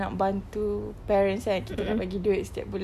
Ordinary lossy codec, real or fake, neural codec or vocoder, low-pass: none; real; none; 14.4 kHz